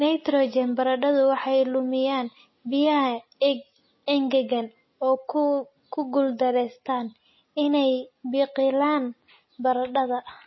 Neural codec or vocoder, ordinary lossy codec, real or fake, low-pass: none; MP3, 24 kbps; real; 7.2 kHz